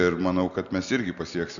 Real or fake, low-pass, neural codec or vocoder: real; 7.2 kHz; none